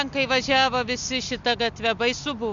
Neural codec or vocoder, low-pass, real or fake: none; 7.2 kHz; real